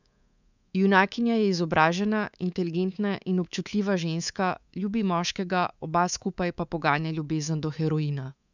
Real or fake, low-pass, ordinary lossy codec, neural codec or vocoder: fake; 7.2 kHz; none; codec, 24 kHz, 3.1 kbps, DualCodec